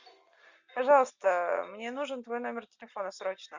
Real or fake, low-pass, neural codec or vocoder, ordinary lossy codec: real; 7.2 kHz; none; Opus, 64 kbps